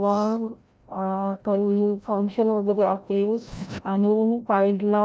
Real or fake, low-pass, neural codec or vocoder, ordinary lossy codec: fake; none; codec, 16 kHz, 0.5 kbps, FreqCodec, larger model; none